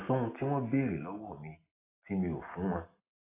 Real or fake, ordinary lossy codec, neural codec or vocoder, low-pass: real; AAC, 16 kbps; none; 3.6 kHz